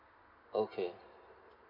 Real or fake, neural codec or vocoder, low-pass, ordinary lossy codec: real; none; 5.4 kHz; none